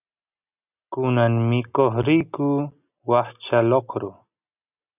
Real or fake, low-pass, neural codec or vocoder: real; 3.6 kHz; none